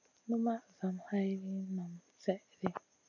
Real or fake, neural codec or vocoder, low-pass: real; none; 7.2 kHz